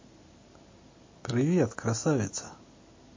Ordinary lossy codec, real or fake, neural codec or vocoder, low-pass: MP3, 32 kbps; real; none; 7.2 kHz